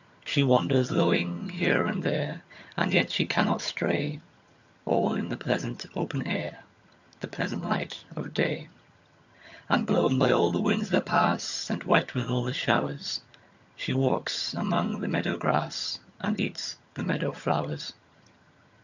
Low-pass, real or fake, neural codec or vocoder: 7.2 kHz; fake; vocoder, 22.05 kHz, 80 mel bands, HiFi-GAN